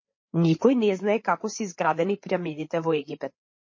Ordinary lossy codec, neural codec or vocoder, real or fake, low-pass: MP3, 32 kbps; codec, 16 kHz, 8 kbps, FreqCodec, larger model; fake; 7.2 kHz